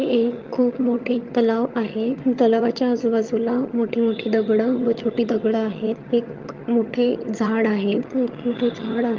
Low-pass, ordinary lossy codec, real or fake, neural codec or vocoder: 7.2 kHz; Opus, 32 kbps; fake; vocoder, 22.05 kHz, 80 mel bands, HiFi-GAN